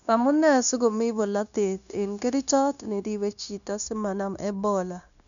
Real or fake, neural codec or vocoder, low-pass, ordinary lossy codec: fake; codec, 16 kHz, 0.9 kbps, LongCat-Audio-Codec; 7.2 kHz; none